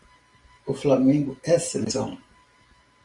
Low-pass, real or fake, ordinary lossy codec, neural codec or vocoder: 10.8 kHz; fake; Opus, 64 kbps; vocoder, 44.1 kHz, 128 mel bands every 512 samples, BigVGAN v2